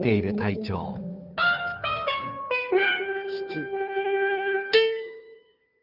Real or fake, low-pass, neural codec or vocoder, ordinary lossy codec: fake; 5.4 kHz; codec, 16 kHz, 2 kbps, FunCodec, trained on Chinese and English, 25 frames a second; none